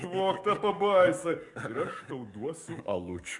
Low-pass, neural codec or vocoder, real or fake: 10.8 kHz; codec, 44.1 kHz, 7.8 kbps, DAC; fake